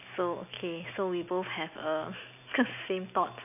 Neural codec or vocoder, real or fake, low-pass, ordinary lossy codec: none; real; 3.6 kHz; none